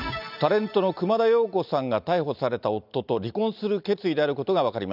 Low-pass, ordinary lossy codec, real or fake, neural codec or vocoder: 5.4 kHz; none; real; none